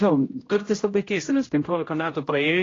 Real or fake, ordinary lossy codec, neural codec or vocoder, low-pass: fake; AAC, 32 kbps; codec, 16 kHz, 0.5 kbps, X-Codec, HuBERT features, trained on general audio; 7.2 kHz